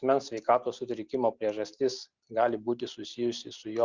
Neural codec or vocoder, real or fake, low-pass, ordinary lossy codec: none; real; 7.2 kHz; Opus, 64 kbps